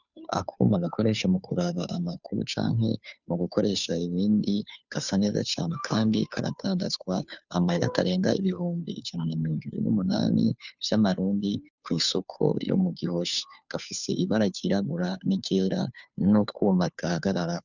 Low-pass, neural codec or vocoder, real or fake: 7.2 kHz; codec, 16 kHz, 2 kbps, FunCodec, trained on Chinese and English, 25 frames a second; fake